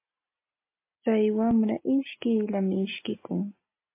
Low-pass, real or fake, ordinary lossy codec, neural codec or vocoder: 3.6 kHz; real; MP3, 24 kbps; none